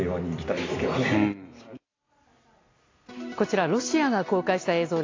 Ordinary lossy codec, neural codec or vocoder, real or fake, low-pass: AAC, 32 kbps; none; real; 7.2 kHz